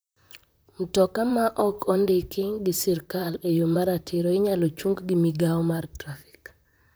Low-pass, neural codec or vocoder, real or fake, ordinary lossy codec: none; vocoder, 44.1 kHz, 128 mel bands, Pupu-Vocoder; fake; none